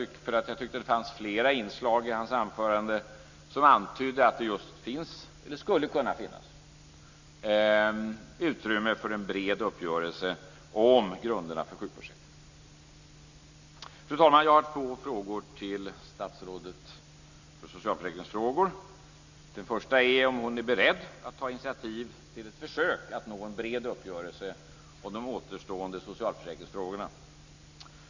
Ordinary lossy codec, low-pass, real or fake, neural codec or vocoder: Opus, 64 kbps; 7.2 kHz; real; none